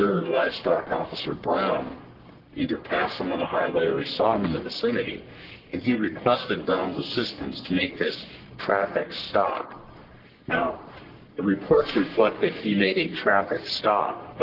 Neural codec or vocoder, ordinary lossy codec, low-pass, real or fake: codec, 44.1 kHz, 1.7 kbps, Pupu-Codec; Opus, 16 kbps; 5.4 kHz; fake